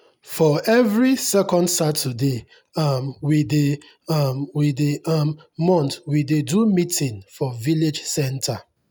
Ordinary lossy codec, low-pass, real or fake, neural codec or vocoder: none; none; real; none